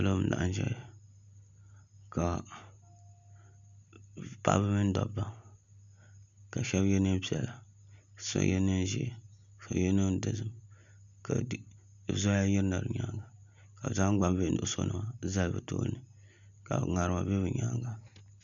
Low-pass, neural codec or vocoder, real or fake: 7.2 kHz; none; real